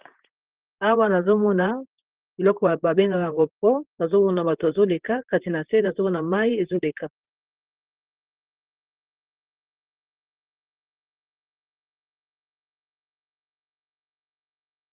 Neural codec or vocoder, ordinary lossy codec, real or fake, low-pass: vocoder, 44.1 kHz, 128 mel bands, Pupu-Vocoder; Opus, 16 kbps; fake; 3.6 kHz